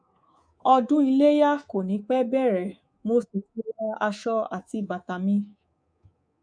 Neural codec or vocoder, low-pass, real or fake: codec, 24 kHz, 3.1 kbps, DualCodec; 9.9 kHz; fake